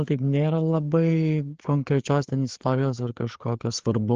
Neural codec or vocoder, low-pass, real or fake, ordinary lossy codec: codec, 16 kHz, 4 kbps, FreqCodec, larger model; 7.2 kHz; fake; Opus, 16 kbps